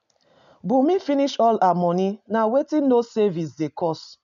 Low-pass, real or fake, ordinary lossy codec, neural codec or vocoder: 7.2 kHz; real; none; none